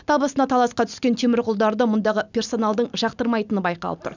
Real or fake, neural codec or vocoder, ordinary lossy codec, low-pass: real; none; none; 7.2 kHz